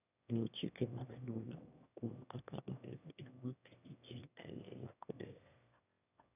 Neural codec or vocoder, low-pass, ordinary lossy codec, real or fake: autoencoder, 22.05 kHz, a latent of 192 numbers a frame, VITS, trained on one speaker; 3.6 kHz; none; fake